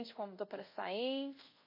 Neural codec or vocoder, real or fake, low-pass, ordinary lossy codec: codec, 24 kHz, 0.5 kbps, DualCodec; fake; 5.4 kHz; MP3, 32 kbps